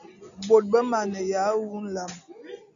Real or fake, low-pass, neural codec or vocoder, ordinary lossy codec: real; 7.2 kHz; none; MP3, 96 kbps